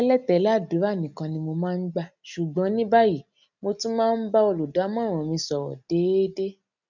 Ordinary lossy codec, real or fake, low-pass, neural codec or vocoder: none; real; 7.2 kHz; none